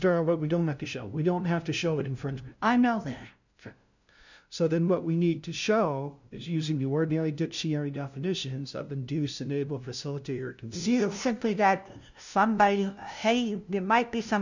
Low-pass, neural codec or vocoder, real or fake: 7.2 kHz; codec, 16 kHz, 0.5 kbps, FunCodec, trained on LibriTTS, 25 frames a second; fake